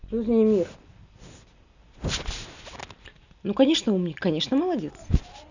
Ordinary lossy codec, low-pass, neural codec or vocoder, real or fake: none; 7.2 kHz; none; real